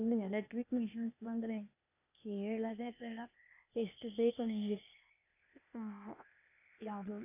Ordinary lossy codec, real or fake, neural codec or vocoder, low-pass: none; fake; codec, 16 kHz, 0.8 kbps, ZipCodec; 3.6 kHz